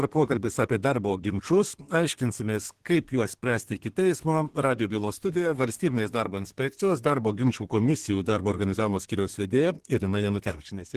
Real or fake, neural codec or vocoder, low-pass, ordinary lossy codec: fake; codec, 32 kHz, 1.9 kbps, SNAC; 14.4 kHz; Opus, 16 kbps